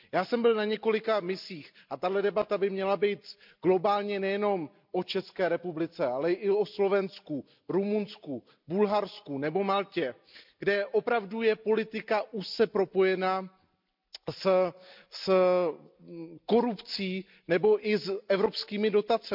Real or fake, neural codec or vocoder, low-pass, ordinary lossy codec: real; none; 5.4 kHz; MP3, 48 kbps